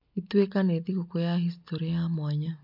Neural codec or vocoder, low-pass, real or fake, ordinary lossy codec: none; 5.4 kHz; real; MP3, 48 kbps